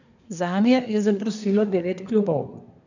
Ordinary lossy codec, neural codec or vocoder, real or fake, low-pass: none; codec, 24 kHz, 1 kbps, SNAC; fake; 7.2 kHz